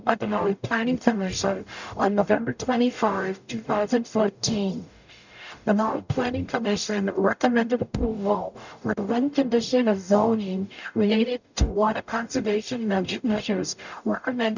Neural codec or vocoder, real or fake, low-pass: codec, 44.1 kHz, 0.9 kbps, DAC; fake; 7.2 kHz